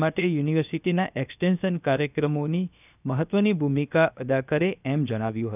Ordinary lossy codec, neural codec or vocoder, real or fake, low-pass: none; codec, 16 kHz, 0.7 kbps, FocalCodec; fake; 3.6 kHz